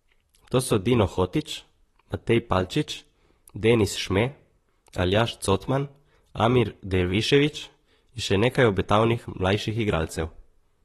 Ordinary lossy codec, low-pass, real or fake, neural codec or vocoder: AAC, 32 kbps; 19.8 kHz; fake; vocoder, 44.1 kHz, 128 mel bands, Pupu-Vocoder